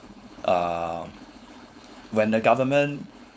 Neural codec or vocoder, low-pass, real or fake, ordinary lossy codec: codec, 16 kHz, 4.8 kbps, FACodec; none; fake; none